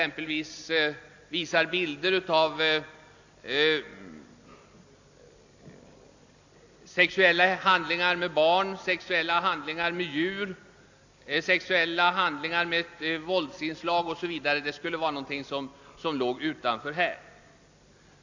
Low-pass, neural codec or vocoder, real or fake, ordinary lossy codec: 7.2 kHz; none; real; AAC, 48 kbps